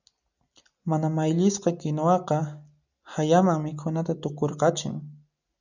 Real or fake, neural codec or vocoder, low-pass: real; none; 7.2 kHz